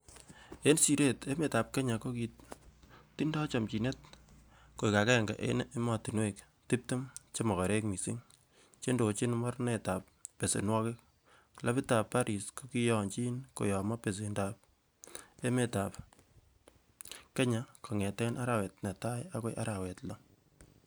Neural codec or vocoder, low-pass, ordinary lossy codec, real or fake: none; none; none; real